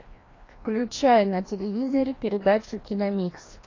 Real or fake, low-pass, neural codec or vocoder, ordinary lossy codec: fake; 7.2 kHz; codec, 16 kHz, 1 kbps, FreqCodec, larger model; AAC, 32 kbps